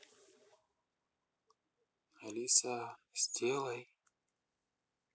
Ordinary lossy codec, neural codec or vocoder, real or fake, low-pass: none; none; real; none